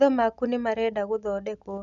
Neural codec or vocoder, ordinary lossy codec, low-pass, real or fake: none; none; 7.2 kHz; real